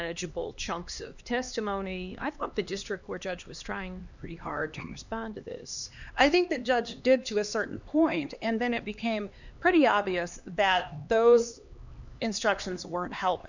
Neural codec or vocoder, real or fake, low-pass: codec, 16 kHz, 2 kbps, X-Codec, HuBERT features, trained on LibriSpeech; fake; 7.2 kHz